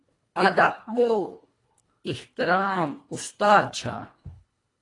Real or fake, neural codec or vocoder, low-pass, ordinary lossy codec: fake; codec, 24 kHz, 1.5 kbps, HILCodec; 10.8 kHz; AAC, 32 kbps